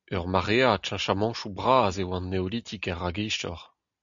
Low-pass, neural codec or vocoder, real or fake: 7.2 kHz; none; real